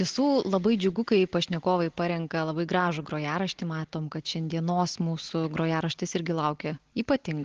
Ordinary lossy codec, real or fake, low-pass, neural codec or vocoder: Opus, 16 kbps; real; 7.2 kHz; none